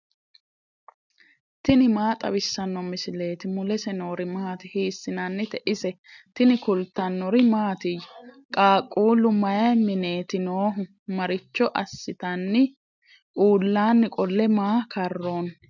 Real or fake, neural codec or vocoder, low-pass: real; none; 7.2 kHz